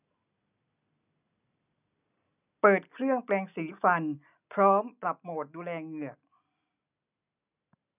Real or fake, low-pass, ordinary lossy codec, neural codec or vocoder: real; 3.6 kHz; none; none